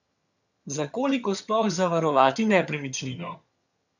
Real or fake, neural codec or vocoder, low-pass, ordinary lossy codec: fake; vocoder, 22.05 kHz, 80 mel bands, HiFi-GAN; 7.2 kHz; none